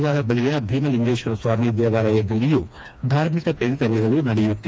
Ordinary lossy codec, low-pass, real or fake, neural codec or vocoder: none; none; fake; codec, 16 kHz, 2 kbps, FreqCodec, smaller model